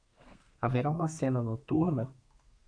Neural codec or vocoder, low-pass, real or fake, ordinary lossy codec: codec, 32 kHz, 1.9 kbps, SNAC; 9.9 kHz; fake; AAC, 48 kbps